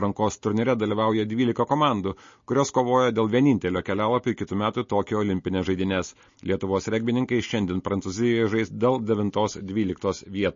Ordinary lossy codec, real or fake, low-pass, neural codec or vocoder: MP3, 32 kbps; real; 7.2 kHz; none